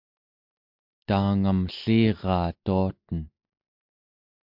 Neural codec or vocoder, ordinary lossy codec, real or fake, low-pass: none; MP3, 48 kbps; real; 5.4 kHz